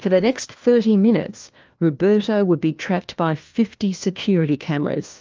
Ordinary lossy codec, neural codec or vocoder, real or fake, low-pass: Opus, 24 kbps; codec, 16 kHz, 1 kbps, FunCodec, trained on LibriTTS, 50 frames a second; fake; 7.2 kHz